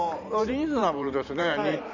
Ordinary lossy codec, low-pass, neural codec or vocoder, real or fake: none; 7.2 kHz; vocoder, 44.1 kHz, 128 mel bands every 512 samples, BigVGAN v2; fake